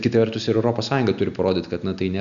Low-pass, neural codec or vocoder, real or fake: 7.2 kHz; none; real